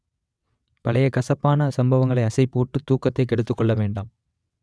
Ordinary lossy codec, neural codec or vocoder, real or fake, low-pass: none; vocoder, 22.05 kHz, 80 mel bands, WaveNeXt; fake; none